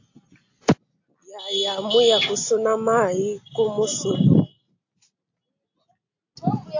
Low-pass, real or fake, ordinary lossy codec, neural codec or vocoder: 7.2 kHz; real; AAC, 48 kbps; none